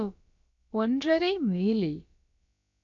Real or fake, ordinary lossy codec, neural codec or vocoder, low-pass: fake; none; codec, 16 kHz, about 1 kbps, DyCAST, with the encoder's durations; 7.2 kHz